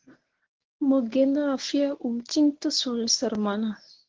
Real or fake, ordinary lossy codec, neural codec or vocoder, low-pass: fake; Opus, 16 kbps; codec, 24 kHz, 0.9 kbps, WavTokenizer, medium speech release version 1; 7.2 kHz